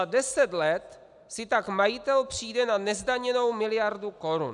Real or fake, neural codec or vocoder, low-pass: real; none; 10.8 kHz